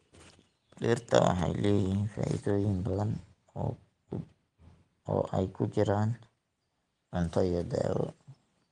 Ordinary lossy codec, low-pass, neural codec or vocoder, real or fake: Opus, 24 kbps; 9.9 kHz; none; real